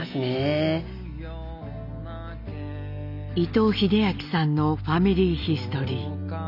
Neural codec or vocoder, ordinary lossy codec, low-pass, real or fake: none; none; 5.4 kHz; real